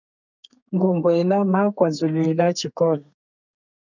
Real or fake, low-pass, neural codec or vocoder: fake; 7.2 kHz; codec, 32 kHz, 1.9 kbps, SNAC